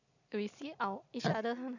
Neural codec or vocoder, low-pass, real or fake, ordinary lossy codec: none; 7.2 kHz; real; none